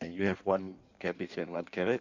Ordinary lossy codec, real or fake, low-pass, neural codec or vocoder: none; fake; 7.2 kHz; codec, 16 kHz in and 24 kHz out, 1.1 kbps, FireRedTTS-2 codec